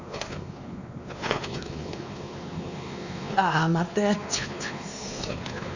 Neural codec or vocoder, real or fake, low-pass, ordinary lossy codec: codec, 16 kHz, 2 kbps, X-Codec, WavLM features, trained on Multilingual LibriSpeech; fake; 7.2 kHz; none